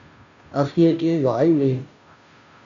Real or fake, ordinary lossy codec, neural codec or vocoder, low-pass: fake; AAC, 64 kbps; codec, 16 kHz, 0.5 kbps, FunCodec, trained on Chinese and English, 25 frames a second; 7.2 kHz